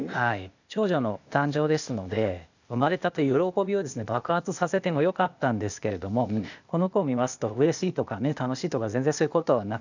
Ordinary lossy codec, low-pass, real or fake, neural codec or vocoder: none; 7.2 kHz; fake; codec, 16 kHz, 0.8 kbps, ZipCodec